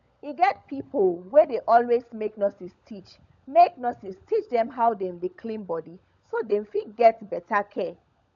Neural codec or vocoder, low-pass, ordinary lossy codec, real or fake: codec, 16 kHz, 16 kbps, FunCodec, trained on LibriTTS, 50 frames a second; 7.2 kHz; MP3, 96 kbps; fake